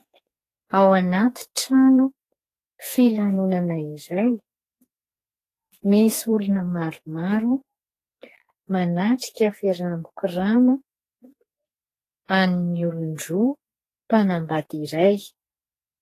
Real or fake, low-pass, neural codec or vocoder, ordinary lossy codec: fake; 14.4 kHz; codec, 44.1 kHz, 2.6 kbps, SNAC; AAC, 48 kbps